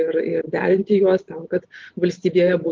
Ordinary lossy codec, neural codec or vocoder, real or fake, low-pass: Opus, 16 kbps; none; real; 7.2 kHz